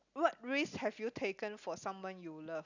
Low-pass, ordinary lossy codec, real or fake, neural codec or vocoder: 7.2 kHz; none; real; none